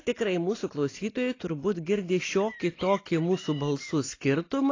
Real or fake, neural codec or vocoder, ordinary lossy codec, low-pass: real; none; AAC, 32 kbps; 7.2 kHz